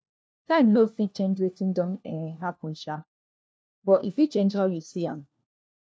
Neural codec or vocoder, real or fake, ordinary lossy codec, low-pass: codec, 16 kHz, 1 kbps, FunCodec, trained on LibriTTS, 50 frames a second; fake; none; none